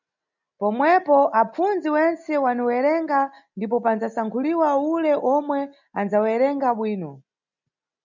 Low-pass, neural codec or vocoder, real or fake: 7.2 kHz; none; real